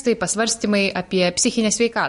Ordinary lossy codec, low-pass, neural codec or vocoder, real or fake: MP3, 48 kbps; 14.4 kHz; none; real